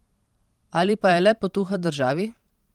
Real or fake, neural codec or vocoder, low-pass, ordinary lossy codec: fake; vocoder, 44.1 kHz, 128 mel bands every 512 samples, BigVGAN v2; 19.8 kHz; Opus, 24 kbps